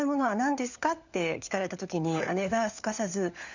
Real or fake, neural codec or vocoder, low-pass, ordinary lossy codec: fake; codec, 16 kHz, 4 kbps, FunCodec, trained on LibriTTS, 50 frames a second; 7.2 kHz; none